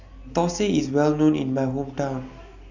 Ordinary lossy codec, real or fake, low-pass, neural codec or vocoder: none; real; 7.2 kHz; none